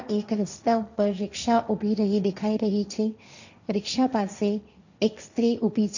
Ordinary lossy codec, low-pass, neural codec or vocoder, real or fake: none; 7.2 kHz; codec, 16 kHz, 1.1 kbps, Voila-Tokenizer; fake